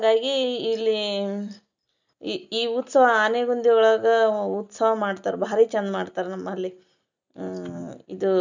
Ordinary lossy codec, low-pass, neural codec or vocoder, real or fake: none; 7.2 kHz; none; real